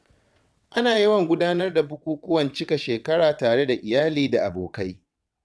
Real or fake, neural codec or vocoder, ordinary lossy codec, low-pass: fake; vocoder, 22.05 kHz, 80 mel bands, WaveNeXt; none; none